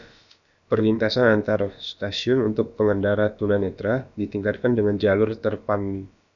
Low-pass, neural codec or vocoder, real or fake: 7.2 kHz; codec, 16 kHz, about 1 kbps, DyCAST, with the encoder's durations; fake